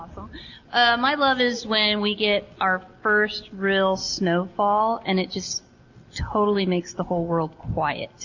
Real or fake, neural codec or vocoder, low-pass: real; none; 7.2 kHz